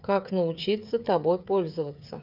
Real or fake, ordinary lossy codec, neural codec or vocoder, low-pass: fake; none; codec, 16 kHz, 8 kbps, FreqCodec, smaller model; 5.4 kHz